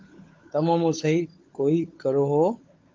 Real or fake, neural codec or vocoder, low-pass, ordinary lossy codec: fake; codec, 16 kHz, 16 kbps, FunCodec, trained on LibriTTS, 50 frames a second; 7.2 kHz; Opus, 24 kbps